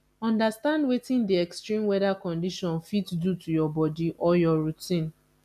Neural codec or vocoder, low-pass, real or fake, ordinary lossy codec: none; 14.4 kHz; real; MP3, 96 kbps